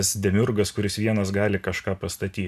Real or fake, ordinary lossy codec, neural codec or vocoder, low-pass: real; AAC, 96 kbps; none; 14.4 kHz